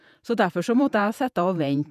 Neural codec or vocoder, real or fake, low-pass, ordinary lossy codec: vocoder, 48 kHz, 128 mel bands, Vocos; fake; 14.4 kHz; none